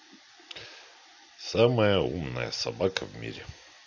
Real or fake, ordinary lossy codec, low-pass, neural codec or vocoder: real; none; 7.2 kHz; none